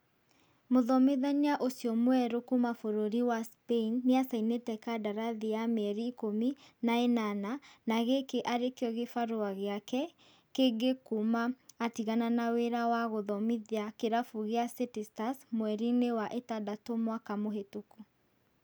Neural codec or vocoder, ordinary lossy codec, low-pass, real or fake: none; none; none; real